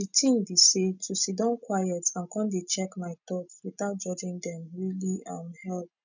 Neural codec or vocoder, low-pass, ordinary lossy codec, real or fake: none; 7.2 kHz; none; real